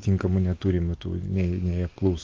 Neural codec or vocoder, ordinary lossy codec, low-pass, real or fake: none; Opus, 32 kbps; 7.2 kHz; real